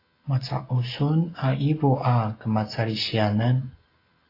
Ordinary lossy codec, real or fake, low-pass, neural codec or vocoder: AAC, 24 kbps; real; 5.4 kHz; none